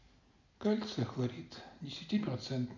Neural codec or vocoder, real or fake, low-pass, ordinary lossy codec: none; real; 7.2 kHz; none